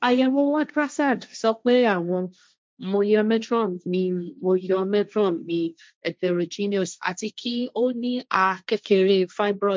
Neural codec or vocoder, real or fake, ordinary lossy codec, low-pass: codec, 16 kHz, 1.1 kbps, Voila-Tokenizer; fake; none; none